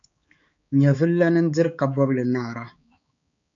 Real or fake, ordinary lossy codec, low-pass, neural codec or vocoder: fake; MP3, 64 kbps; 7.2 kHz; codec, 16 kHz, 4 kbps, X-Codec, HuBERT features, trained on balanced general audio